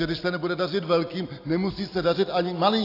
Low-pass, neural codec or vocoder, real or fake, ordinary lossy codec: 5.4 kHz; none; real; AAC, 32 kbps